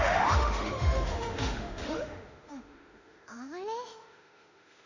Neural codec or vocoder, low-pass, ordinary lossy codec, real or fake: autoencoder, 48 kHz, 32 numbers a frame, DAC-VAE, trained on Japanese speech; 7.2 kHz; none; fake